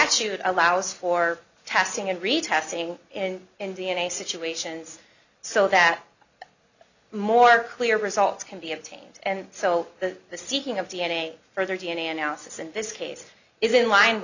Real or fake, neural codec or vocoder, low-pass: real; none; 7.2 kHz